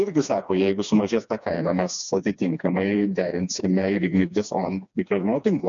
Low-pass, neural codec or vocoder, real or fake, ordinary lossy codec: 7.2 kHz; codec, 16 kHz, 2 kbps, FreqCodec, smaller model; fake; MP3, 96 kbps